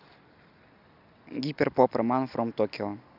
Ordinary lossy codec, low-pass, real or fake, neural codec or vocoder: none; 5.4 kHz; real; none